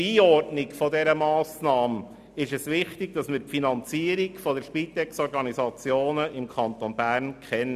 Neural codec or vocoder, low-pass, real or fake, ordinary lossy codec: none; 14.4 kHz; real; none